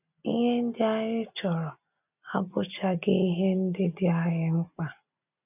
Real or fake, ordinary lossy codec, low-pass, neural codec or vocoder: real; none; 3.6 kHz; none